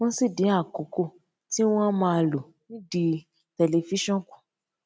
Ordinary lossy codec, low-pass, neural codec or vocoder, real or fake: none; none; none; real